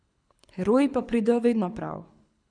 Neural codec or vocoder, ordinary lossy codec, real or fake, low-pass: codec, 24 kHz, 6 kbps, HILCodec; none; fake; 9.9 kHz